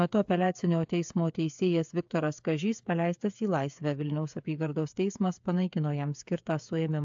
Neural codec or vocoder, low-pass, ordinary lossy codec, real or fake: codec, 16 kHz, 8 kbps, FreqCodec, smaller model; 7.2 kHz; AAC, 64 kbps; fake